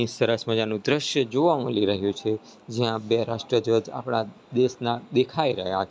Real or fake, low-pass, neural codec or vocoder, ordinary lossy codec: real; none; none; none